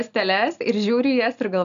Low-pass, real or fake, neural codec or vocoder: 7.2 kHz; real; none